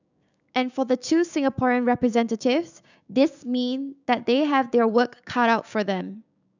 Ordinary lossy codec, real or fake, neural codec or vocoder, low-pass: none; fake; codec, 16 kHz, 6 kbps, DAC; 7.2 kHz